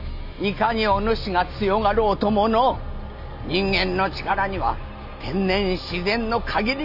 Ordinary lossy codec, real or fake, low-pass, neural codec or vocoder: none; real; 5.4 kHz; none